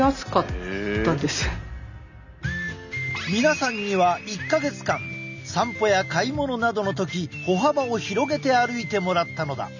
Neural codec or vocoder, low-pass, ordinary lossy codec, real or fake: none; 7.2 kHz; none; real